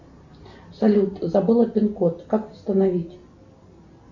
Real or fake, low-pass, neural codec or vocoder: real; 7.2 kHz; none